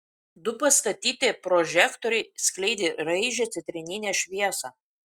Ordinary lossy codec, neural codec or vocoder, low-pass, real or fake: Opus, 64 kbps; none; 19.8 kHz; real